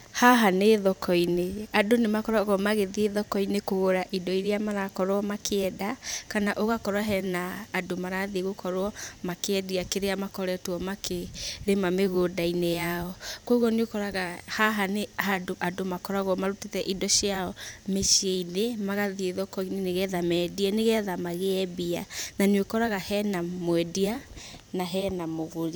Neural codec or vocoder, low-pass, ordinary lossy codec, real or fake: vocoder, 44.1 kHz, 128 mel bands every 512 samples, BigVGAN v2; none; none; fake